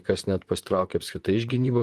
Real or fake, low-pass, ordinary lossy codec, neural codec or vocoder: fake; 14.4 kHz; Opus, 24 kbps; vocoder, 44.1 kHz, 128 mel bands, Pupu-Vocoder